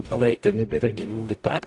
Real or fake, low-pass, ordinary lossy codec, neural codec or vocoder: fake; 10.8 kHz; AAC, 48 kbps; codec, 44.1 kHz, 0.9 kbps, DAC